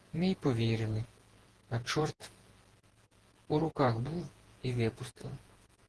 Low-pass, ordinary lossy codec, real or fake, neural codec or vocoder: 10.8 kHz; Opus, 16 kbps; fake; vocoder, 48 kHz, 128 mel bands, Vocos